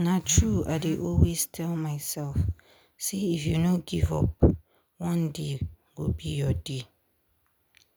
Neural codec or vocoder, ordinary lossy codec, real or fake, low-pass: none; none; real; none